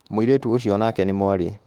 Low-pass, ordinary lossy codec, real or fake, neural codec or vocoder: 19.8 kHz; Opus, 32 kbps; fake; autoencoder, 48 kHz, 32 numbers a frame, DAC-VAE, trained on Japanese speech